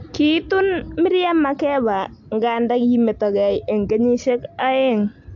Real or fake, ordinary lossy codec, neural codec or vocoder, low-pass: real; AAC, 64 kbps; none; 7.2 kHz